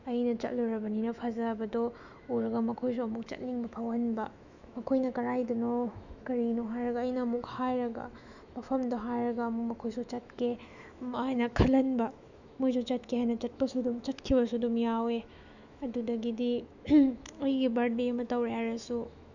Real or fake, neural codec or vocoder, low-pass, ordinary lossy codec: fake; autoencoder, 48 kHz, 128 numbers a frame, DAC-VAE, trained on Japanese speech; 7.2 kHz; none